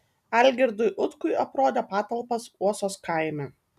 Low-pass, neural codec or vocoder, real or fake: 14.4 kHz; none; real